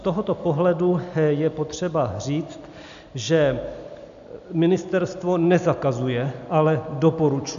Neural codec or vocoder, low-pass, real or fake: none; 7.2 kHz; real